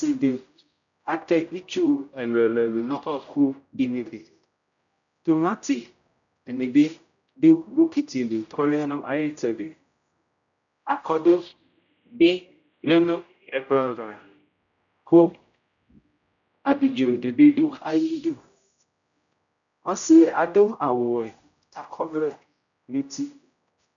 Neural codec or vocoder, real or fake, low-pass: codec, 16 kHz, 0.5 kbps, X-Codec, HuBERT features, trained on general audio; fake; 7.2 kHz